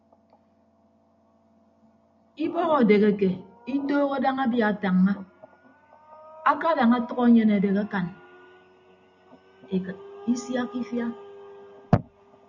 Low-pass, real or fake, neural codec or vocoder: 7.2 kHz; real; none